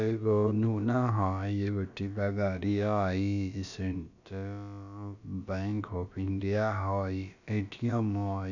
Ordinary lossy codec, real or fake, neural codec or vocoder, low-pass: none; fake; codec, 16 kHz, about 1 kbps, DyCAST, with the encoder's durations; 7.2 kHz